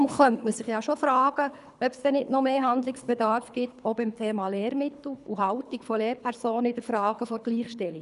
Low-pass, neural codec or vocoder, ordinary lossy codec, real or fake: 10.8 kHz; codec, 24 kHz, 3 kbps, HILCodec; none; fake